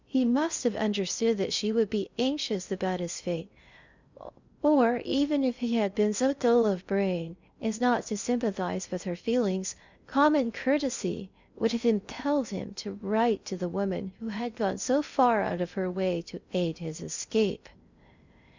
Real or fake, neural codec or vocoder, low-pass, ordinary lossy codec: fake; codec, 16 kHz in and 24 kHz out, 0.6 kbps, FocalCodec, streaming, 2048 codes; 7.2 kHz; Opus, 64 kbps